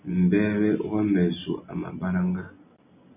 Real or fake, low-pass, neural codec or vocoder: real; 3.6 kHz; none